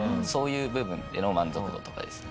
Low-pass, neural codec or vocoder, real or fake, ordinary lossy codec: none; none; real; none